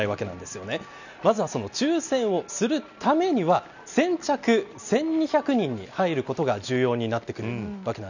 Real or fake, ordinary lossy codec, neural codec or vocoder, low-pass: real; none; none; 7.2 kHz